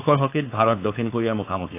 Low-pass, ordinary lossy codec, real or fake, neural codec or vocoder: 3.6 kHz; AAC, 24 kbps; fake; codec, 16 kHz, 4.8 kbps, FACodec